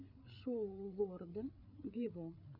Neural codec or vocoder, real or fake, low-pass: codec, 16 kHz, 8 kbps, FreqCodec, larger model; fake; 5.4 kHz